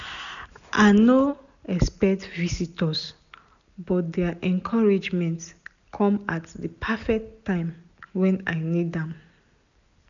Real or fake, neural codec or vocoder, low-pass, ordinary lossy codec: real; none; 7.2 kHz; none